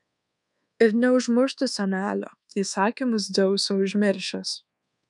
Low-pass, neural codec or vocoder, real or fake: 10.8 kHz; codec, 24 kHz, 1.2 kbps, DualCodec; fake